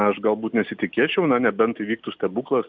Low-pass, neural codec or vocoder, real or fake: 7.2 kHz; none; real